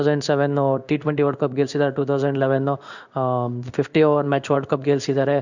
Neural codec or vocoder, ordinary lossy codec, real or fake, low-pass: codec, 16 kHz in and 24 kHz out, 1 kbps, XY-Tokenizer; none; fake; 7.2 kHz